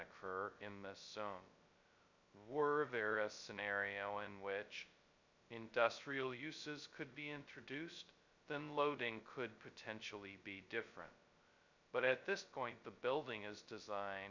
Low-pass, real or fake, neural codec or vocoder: 7.2 kHz; fake; codec, 16 kHz, 0.2 kbps, FocalCodec